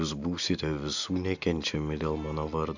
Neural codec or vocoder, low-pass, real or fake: none; 7.2 kHz; real